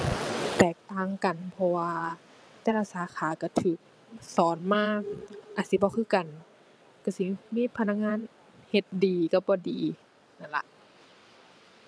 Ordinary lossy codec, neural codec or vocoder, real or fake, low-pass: none; vocoder, 22.05 kHz, 80 mel bands, WaveNeXt; fake; none